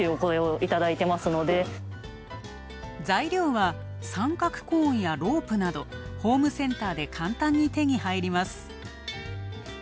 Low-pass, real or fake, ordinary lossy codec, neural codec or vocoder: none; real; none; none